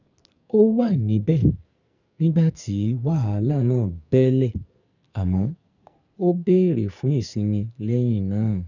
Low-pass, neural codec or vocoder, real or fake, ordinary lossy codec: 7.2 kHz; codec, 44.1 kHz, 2.6 kbps, SNAC; fake; none